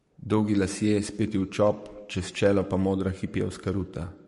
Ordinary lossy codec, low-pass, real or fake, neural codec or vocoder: MP3, 48 kbps; 14.4 kHz; fake; codec, 44.1 kHz, 7.8 kbps, Pupu-Codec